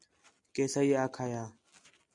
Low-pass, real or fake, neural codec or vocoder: 10.8 kHz; real; none